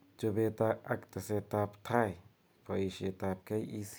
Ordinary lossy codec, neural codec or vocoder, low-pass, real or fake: none; none; none; real